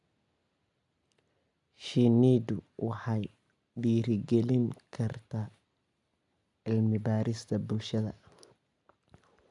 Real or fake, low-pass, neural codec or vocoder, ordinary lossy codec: real; 10.8 kHz; none; none